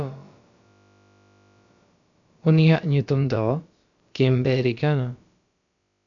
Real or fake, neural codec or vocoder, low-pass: fake; codec, 16 kHz, about 1 kbps, DyCAST, with the encoder's durations; 7.2 kHz